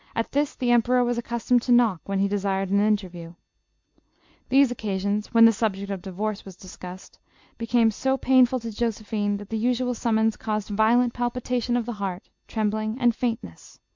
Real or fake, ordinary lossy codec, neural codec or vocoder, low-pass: real; MP3, 64 kbps; none; 7.2 kHz